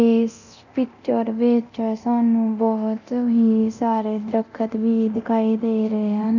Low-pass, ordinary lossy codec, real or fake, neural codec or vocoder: 7.2 kHz; none; fake; codec, 24 kHz, 0.9 kbps, DualCodec